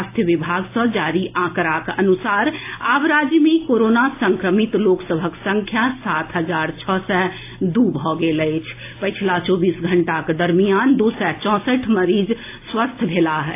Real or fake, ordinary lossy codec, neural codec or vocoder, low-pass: real; AAC, 24 kbps; none; 3.6 kHz